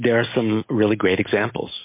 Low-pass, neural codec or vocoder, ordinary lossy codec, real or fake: 3.6 kHz; none; MP3, 24 kbps; real